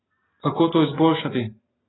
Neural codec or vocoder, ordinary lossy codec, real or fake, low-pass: none; AAC, 16 kbps; real; 7.2 kHz